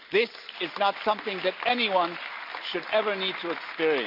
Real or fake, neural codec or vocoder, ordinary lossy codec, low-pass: real; none; none; 5.4 kHz